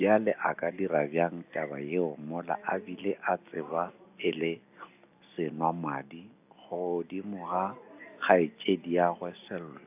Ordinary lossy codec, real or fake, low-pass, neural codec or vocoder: AAC, 32 kbps; real; 3.6 kHz; none